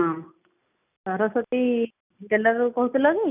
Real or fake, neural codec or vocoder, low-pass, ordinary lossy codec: real; none; 3.6 kHz; none